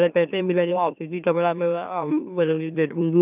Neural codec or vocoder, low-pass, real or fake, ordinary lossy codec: autoencoder, 44.1 kHz, a latent of 192 numbers a frame, MeloTTS; 3.6 kHz; fake; none